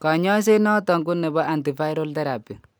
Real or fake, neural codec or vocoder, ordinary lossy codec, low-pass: real; none; none; none